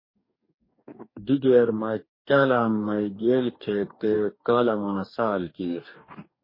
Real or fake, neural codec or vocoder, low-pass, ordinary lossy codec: fake; codec, 44.1 kHz, 2.6 kbps, DAC; 5.4 kHz; MP3, 24 kbps